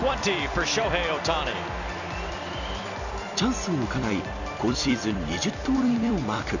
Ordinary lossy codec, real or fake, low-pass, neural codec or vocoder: none; fake; 7.2 kHz; vocoder, 44.1 kHz, 128 mel bands every 256 samples, BigVGAN v2